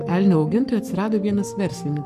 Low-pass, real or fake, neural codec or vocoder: 14.4 kHz; fake; codec, 44.1 kHz, 7.8 kbps, Pupu-Codec